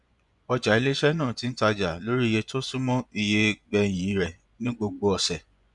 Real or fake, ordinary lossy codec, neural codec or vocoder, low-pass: fake; none; vocoder, 24 kHz, 100 mel bands, Vocos; 10.8 kHz